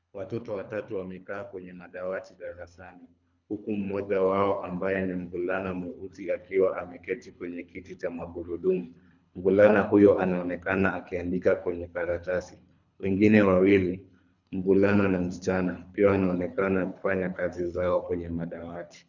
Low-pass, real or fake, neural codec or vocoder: 7.2 kHz; fake; codec, 24 kHz, 3 kbps, HILCodec